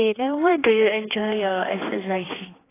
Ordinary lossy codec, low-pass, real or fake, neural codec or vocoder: AAC, 16 kbps; 3.6 kHz; fake; codec, 16 kHz, 2 kbps, X-Codec, HuBERT features, trained on general audio